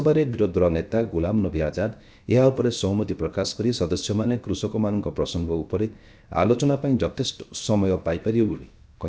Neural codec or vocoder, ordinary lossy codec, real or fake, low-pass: codec, 16 kHz, 0.7 kbps, FocalCodec; none; fake; none